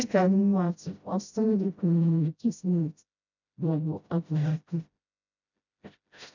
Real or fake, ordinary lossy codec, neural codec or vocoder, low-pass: fake; none; codec, 16 kHz, 0.5 kbps, FreqCodec, smaller model; 7.2 kHz